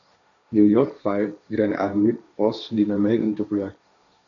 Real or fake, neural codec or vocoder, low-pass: fake; codec, 16 kHz, 1.1 kbps, Voila-Tokenizer; 7.2 kHz